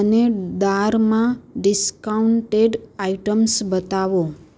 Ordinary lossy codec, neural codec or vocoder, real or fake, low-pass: none; none; real; none